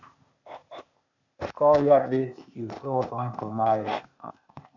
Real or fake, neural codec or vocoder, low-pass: fake; codec, 16 kHz, 0.8 kbps, ZipCodec; 7.2 kHz